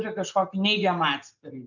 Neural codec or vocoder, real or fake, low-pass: none; real; 7.2 kHz